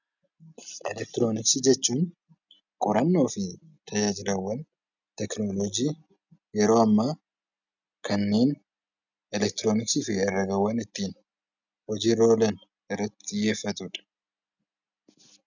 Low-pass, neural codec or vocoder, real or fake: 7.2 kHz; none; real